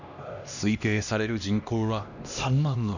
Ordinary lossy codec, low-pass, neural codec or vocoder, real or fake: none; 7.2 kHz; codec, 16 kHz, 1 kbps, X-Codec, HuBERT features, trained on LibriSpeech; fake